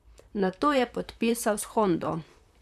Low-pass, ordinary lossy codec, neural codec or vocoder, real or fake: 14.4 kHz; none; vocoder, 44.1 kHz, 128 mel bands, Pupu-Vocoder; fake